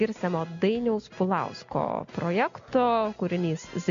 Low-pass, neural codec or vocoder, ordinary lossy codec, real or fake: 7.2 kHz; none; MP3, 64 kbps; real